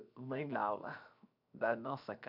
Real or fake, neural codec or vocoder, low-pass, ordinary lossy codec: fake; codec, 16 kHz, 0.7 kbps, FocalCodec; 5.4 kHz; none